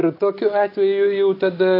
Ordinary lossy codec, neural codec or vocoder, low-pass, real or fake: AAC, 32 kbps; none; 5.4 kHz; real